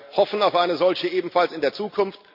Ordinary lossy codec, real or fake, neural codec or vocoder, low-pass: AAC, 48 kbps; real; none; 5.4 kHz